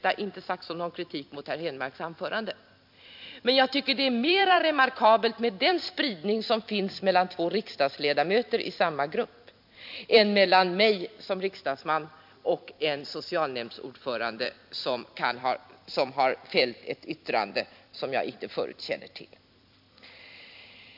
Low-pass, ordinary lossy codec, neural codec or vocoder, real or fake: 5.4 kHz; MP3, 48 kbps; vocoder, 44.1 kHz, 128 mel bands every 256 samples, BigVGAN v2; fake